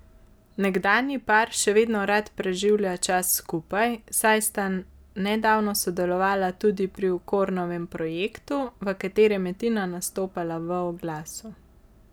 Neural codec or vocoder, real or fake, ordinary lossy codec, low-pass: none; real; none; none